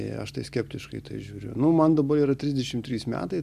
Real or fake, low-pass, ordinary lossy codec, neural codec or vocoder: real; 14.4 kHz; MP3, 96 kbps; none